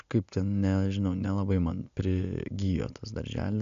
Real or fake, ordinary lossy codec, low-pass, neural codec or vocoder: real; AAC, 96 kbps; 7.2 kHz; none